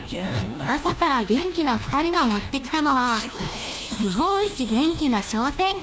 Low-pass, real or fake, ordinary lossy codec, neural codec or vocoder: none; fake; none; codec, 16 kHz, 1 kbps, FunCodec, trained on LibriTTS, 50 frames a second